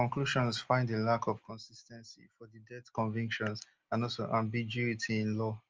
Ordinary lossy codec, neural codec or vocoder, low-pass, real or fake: Opus, 24 kbps; none; 7.2 kHz; real